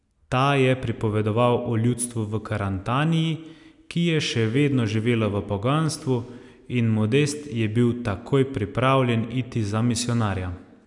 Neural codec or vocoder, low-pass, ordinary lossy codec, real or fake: none; 10.8 kHz; none; real